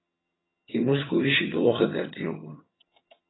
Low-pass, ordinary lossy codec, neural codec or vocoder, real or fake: 7.2 kHz; AAC, 16 kbps; vocoder, 22.05 kHz, 80 mel bands, HiFi-GAN; fake